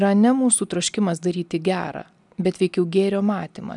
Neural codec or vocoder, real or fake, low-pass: none; real; 10.8 kHz